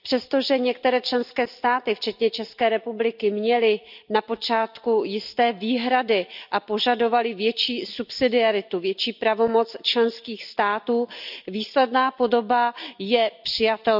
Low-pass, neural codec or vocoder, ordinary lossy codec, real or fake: 5.4 kHz; none; none; real